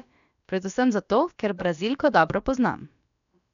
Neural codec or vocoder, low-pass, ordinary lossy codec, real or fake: codec, 16 kHz, about 1 kbps, DyCAST, with the encoder's durations; 7.2 kHz; none; fake